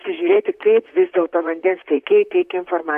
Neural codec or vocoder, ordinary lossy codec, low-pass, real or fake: vocoder, 44.1 kHz, 128 mel bands, Pupu-Vocoder; AAC, 96 kbps; 14.4 kHz; fake